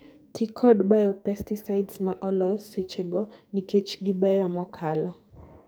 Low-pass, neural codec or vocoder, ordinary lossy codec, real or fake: none; codec, 44.1 kHz, 2.6 kbps, SNAC; none; fake